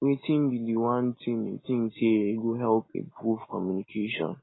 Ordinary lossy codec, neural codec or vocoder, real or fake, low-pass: AAC, 16 kbps; none; real; 7.2 kHz